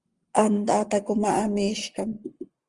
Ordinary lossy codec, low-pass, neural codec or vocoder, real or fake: Opus, 24 kbps; 10.8 kHz; codec, 44.1 kHz, 7.8 kbps, Pupu-Codec; fake